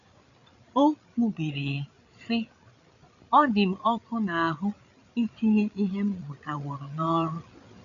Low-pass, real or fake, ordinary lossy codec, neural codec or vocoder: 7.2 kHz; fake; MP3, 96 kbps; codec, 16 kHz, 8 kbps, FreqCodec, larger model